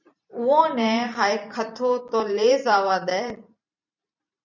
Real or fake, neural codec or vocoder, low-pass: fake; vocoder, 44.1 kHz, 128 mel bands every 512 samples, BigVGAN v2; 7.2 kHz